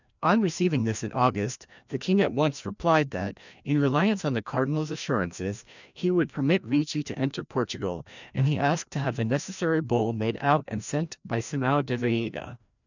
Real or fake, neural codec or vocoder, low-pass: fake; codec, 16 kHz, 1 kbps, FreqCodec, larger model; 7.2 kHz